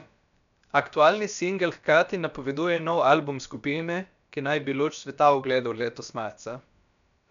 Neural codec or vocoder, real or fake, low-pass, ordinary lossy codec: codec, 16 kHz, about 1 kbps, DyCAST, with the encoder's durations; fake; 7.2 kHz; none